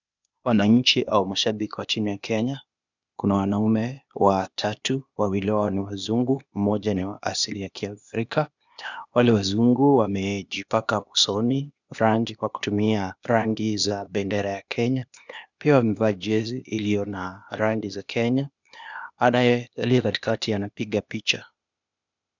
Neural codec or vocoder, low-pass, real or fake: codec, 16 kHz, 0.8 kbps, ZipCodec; 7.2 kHz; fake